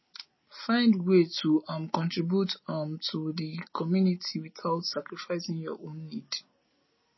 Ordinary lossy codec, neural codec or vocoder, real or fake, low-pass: MP3, 24 kbps; none; real; 7.2 kHz